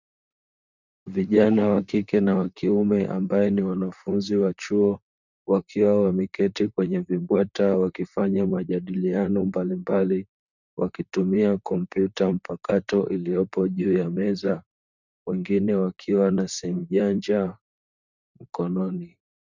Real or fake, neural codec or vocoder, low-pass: fake; vocoder, 44.1 kHz, 128 mel bands, Pupu-Vocoder; 7.2 kHz